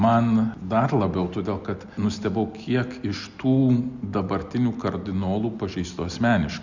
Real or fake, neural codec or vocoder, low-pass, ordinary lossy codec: real; none; 7.2 kHz; Opus, 64 kbps